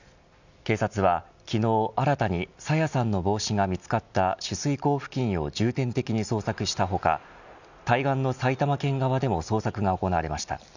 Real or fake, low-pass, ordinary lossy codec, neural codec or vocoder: real; 7.2 kHz; none; none